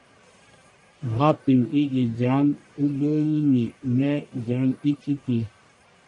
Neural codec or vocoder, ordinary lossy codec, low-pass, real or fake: codec, 44.1 kHz, 1.7 kbps, Pupu-Codec; AAC, 64 kbps; 10.8 kHz; fake